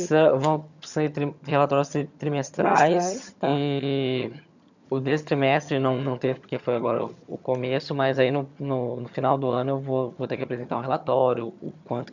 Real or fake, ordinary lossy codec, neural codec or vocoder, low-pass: fake; none; vocoder, 22.05 kHz, 80 mel bands, HiFi-GAN; 7.2 kHz